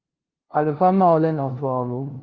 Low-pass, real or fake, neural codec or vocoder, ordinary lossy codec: 7.2 kHz; fake; codec, 16 kHz, 0.5 kbps, FunCodec, trained on LibriTTS, 25 frames a second; Opus, 16 kbps